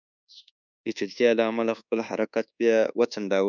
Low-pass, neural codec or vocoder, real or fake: 7.2 kHz; codec, 24 kHz, 1.2 kbps, DualCodec; fake